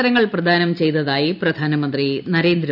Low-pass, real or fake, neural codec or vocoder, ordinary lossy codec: 5.4 kHz; real; none; none